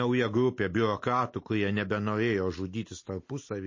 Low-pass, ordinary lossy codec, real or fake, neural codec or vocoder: 7.2 kHz; MP3, 32 kbps; real; none